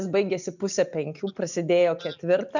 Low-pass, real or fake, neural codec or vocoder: 7.2 kHz; real; none